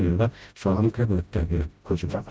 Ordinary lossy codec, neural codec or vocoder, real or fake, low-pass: none; codec, 16 kHz, 0.5 kbps, FreqCodec, smaller model; fake; none